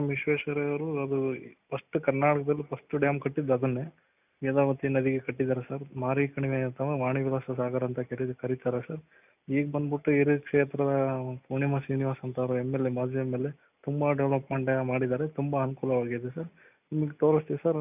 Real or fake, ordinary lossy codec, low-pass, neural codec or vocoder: real; none; 3.6 kHz; none